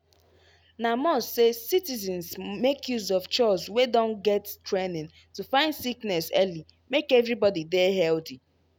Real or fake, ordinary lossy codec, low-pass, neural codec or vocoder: real; none; none; none